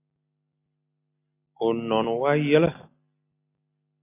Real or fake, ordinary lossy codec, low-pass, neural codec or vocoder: real; AAC, 24 kbps; 3.6 kHz; none